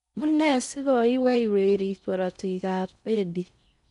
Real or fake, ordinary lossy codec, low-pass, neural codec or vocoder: fake; none; 10.8 kHz; codec, 16 kHz in and 24 kHz out, 0.6 kbps, FocalCodec, streaming, 4096 codes